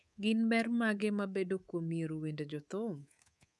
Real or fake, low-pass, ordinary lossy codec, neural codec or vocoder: fake; none; none; codec, 24 kHz, 3.1 kbps, DualCodec